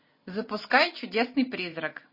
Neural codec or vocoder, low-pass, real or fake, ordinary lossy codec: none; 5.4 kHz; real; MP3, 24 kbps